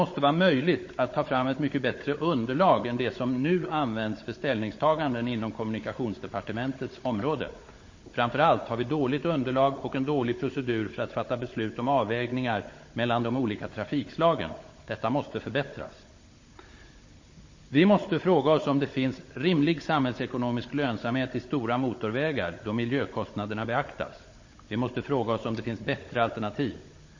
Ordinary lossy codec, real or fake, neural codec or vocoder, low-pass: MP3, 32 kbps; fake; codec, 16 kHz, 16 kbps, FunCodec, trained on Chinese and English, 50 frames a second; 7.2 kHz